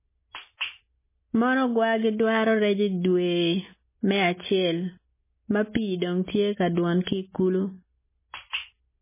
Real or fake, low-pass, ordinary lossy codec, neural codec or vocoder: real; 3.6 kHz; MP3, 16 kbps; none